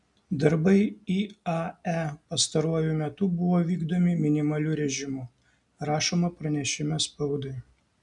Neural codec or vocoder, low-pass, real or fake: none; 10.8 kHz; real